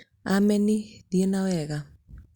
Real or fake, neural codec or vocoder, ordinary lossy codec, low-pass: real; none; Opus, 64 kbps; 19.8 kHz